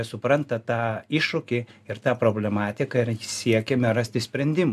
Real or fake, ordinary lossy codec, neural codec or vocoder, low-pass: real; AAC, 96 kbps; none; 14.4 kHz